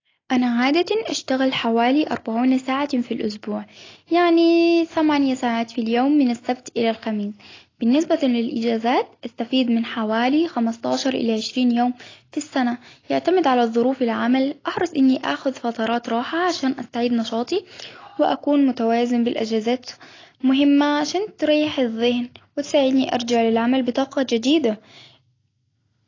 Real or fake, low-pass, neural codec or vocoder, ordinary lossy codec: real; 7.2 kHz; none; AAC, 32 kbps